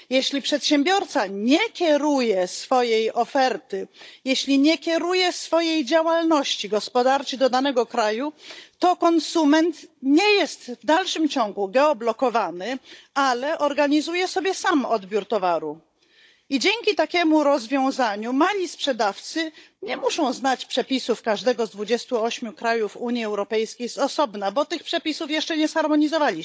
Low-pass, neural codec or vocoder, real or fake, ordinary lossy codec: none; codec, 16 kHz, 16 kbps, FunCodec, trained on Chinese and English, 50 frames a second; fake; none